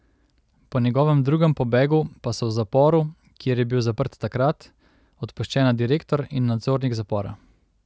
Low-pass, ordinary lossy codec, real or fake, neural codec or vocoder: none; none; real; none